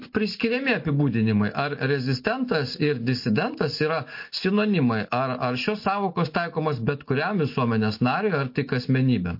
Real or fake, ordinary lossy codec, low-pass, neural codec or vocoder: real; MP3, 32 kbps; 5.4 kHz; none